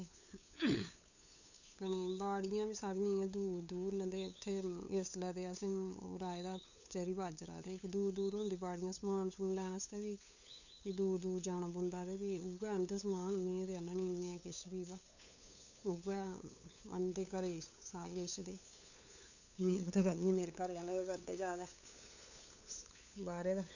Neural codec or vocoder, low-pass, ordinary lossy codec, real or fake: codec, 16 kHz, 8 kbps, FunCodec, trained on LibriTTS, 25 frames a second; 7.2 kHz; none; fake